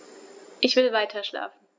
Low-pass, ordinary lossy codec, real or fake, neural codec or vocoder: none; none; real; none